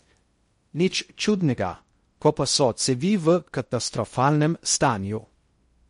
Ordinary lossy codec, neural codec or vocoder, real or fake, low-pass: MP3, 48 kbps; codec, 16 kHz in and 24 kHz out, 0.6 kbps, FocalCodec, streaming, 2048 codes; fake; 10.8 kHz